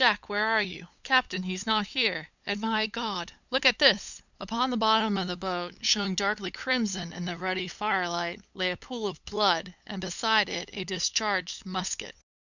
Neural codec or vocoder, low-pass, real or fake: codec, 16 kHz, 8 kbps, FunCodec, trained on LibriTTS, 25 frames a second; 7.2 kHz; fake